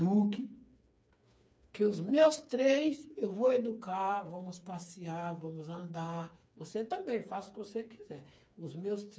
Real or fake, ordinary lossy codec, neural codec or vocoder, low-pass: fake; none; codec, 16 kHz, 4 kbps, FreqCodec, smaller model; none